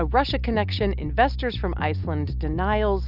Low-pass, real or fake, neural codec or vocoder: 5.4 kHz; real; none